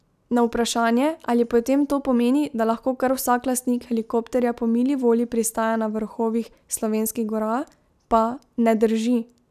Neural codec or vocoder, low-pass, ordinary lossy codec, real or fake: none; 14.4 kHz; none; real